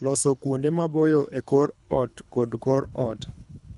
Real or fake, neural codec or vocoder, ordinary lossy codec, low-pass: fake; codec, 24 kHz, 3 kbps, HILCodec; none; 10.8 kHz